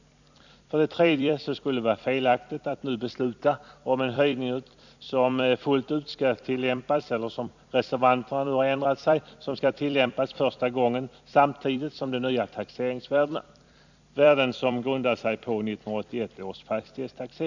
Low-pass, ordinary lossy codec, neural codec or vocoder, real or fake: 7.2 kHz; none; vocoder, 44.1 kHz, 128 mel bands every 256 samples, BigVGAN v2; fake